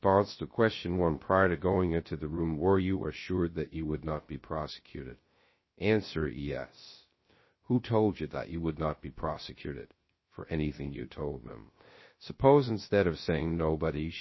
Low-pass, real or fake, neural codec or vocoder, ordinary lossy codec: 7.2 kHz; fake; codec, 16 kHz, 0.3 kbps, FocalCodec; MP3, 24 kbps